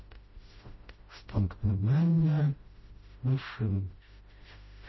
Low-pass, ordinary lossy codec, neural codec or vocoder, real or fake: 7.2 kHz; MP3, 24 kbps; codec, 16 kHz, 0.5 kbps, FreqCodec, smaller model; fake